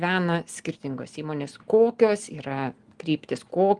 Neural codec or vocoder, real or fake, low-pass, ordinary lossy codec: none; real; 10.8 kHz; Opus, 24 kbps